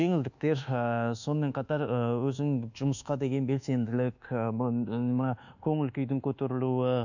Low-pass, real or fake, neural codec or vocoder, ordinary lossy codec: 7.2 kHz; fake; codec, 24 kHz, 1.2 kbps, DualCodec; none